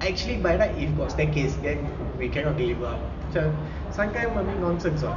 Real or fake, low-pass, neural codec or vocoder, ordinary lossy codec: real; 7.2 kHz; none; none